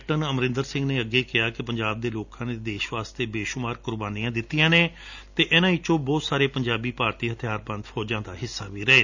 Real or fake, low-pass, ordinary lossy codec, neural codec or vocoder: real; 7.2 kHz; none; none